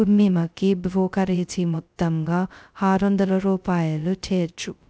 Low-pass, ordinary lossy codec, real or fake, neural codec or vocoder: none; none; fake; codec, 16 kHz, 0.2 kbps, FocalCodec